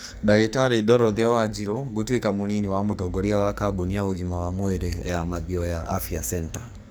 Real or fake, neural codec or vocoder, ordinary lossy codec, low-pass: fake; codec, 44.1 kHz, 2.6 kbps, SNAC; none; none